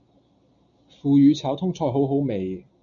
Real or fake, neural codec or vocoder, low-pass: real; none; 7.2 kHz